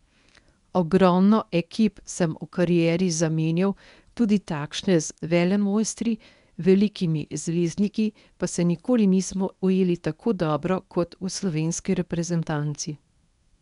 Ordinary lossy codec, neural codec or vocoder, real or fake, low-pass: none; codec, 24 kHz, 0.9 kbps, WavTokenizer, medium speech release version 1; fake; 10.8 kHz